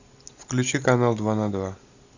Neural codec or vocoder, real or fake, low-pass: none; real; 7.2 kHz